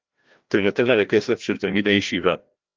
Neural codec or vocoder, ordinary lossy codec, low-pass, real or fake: codec, 16 kHz, 1 kbps, FreqCodec, larger model; Opus, 32 kbps; 7.2 kHz; fake